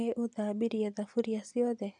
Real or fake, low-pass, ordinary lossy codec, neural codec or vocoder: real; 10.8 kHz; none; none